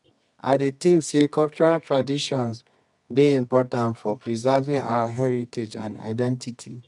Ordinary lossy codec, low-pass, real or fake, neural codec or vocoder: none; 10.8 kHz; fake; codec, 24 kHz, 0.9 kbps, WavTokenizer, medium music audio release